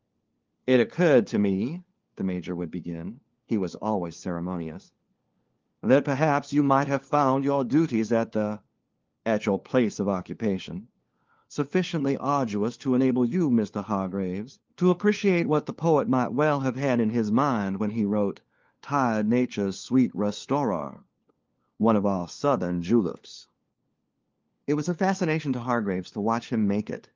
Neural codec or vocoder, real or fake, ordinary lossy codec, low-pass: codec, 16 kHz, 4 kbps, FunCodec, trained on LibriTTS, 50 frames a second; fake; Opus, 32 kbps; 7.2 kHz